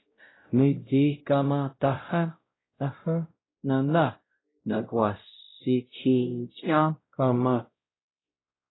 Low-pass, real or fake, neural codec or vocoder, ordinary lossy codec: 7.2 kHz; fake; codec, 16 kHz, 0.5 kbps, X-Codec, WavLM features, trained on Multilingual LibriSpeech; AAC, 16 kbps